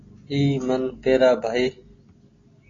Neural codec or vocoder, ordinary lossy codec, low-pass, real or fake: none; AAC, 32 kbps; 7.2 kHz; real